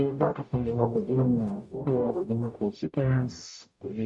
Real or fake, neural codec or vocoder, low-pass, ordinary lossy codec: fake; codec, 44.1 kHz, 0.9 kbps, DAC; 10.8 kHz; MP3, 64 kbps